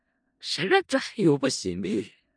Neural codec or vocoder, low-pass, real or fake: codec, 16 kHz in and 24 kHz out, 0.4 kbps, LongCat-Audio-Codec, four codebook decoder; 9.9 kHz; fake